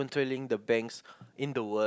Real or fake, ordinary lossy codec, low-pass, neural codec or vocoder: real; none; none; none